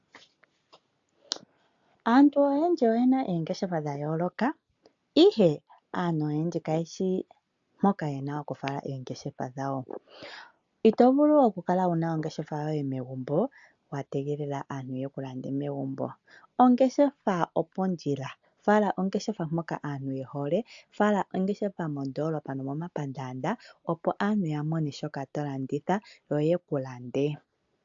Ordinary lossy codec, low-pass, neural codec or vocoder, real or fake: AAC, 64 kbps; 7.2 kHz; none; real